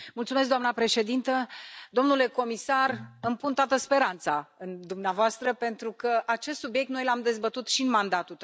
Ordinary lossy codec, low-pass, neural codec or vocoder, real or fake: none; none; none; real